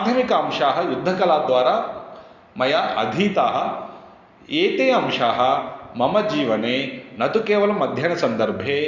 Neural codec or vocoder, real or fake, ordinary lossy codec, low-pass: none; real; Opus, 64 kbps; 7.2 kHz